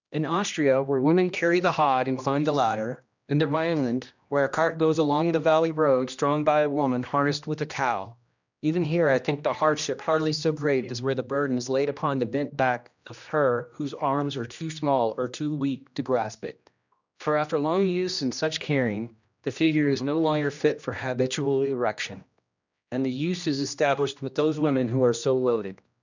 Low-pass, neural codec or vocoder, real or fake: 7.2 kHz; codec, 16 kHz, 1 kbps, X-Codec, HuBERT features, trained on general audio; fake